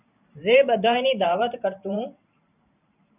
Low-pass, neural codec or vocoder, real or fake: 3.6 kHz; vocoder, 44.1 kHz, 128 mel bands every 512 samples, BigVGAN v2; fake